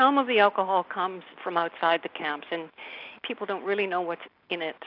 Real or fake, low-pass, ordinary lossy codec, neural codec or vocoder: real; 5.4 kHz; AAC, 48 kbps; none